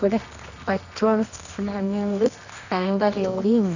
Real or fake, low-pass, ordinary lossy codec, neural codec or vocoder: fake; 7.2 kHz; none; codec, 24 kHz, 0.9 kbps, WavTokenizer, medium music audio release